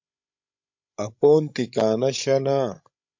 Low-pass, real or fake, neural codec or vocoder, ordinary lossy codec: 7.2 kHz; fake; codec, 16 kHz, 16 kbps, FreqCodec, larger model; MP3, 48 kbps